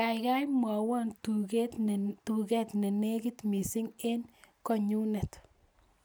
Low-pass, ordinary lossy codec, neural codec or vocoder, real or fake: none; none; none; real